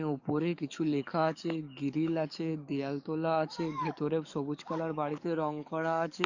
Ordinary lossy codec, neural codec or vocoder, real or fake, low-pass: none; codec, 44.1 kHz, 7.8 kbps, DAC; fake; 7.2 kHz